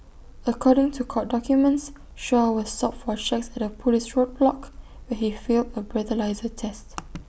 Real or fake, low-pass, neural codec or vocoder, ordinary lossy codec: real; none; none; none